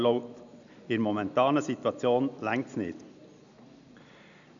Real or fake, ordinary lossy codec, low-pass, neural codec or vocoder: real; none; 7.2 kHz; none